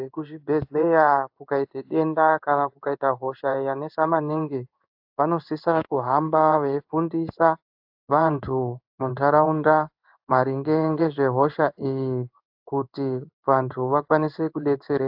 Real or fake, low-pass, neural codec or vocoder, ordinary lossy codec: fake; 5.4 kHz; codec, 16 kHz in and 24 kHz out, 1 kbps, XY-Tokenizer; AAC, 48 kbps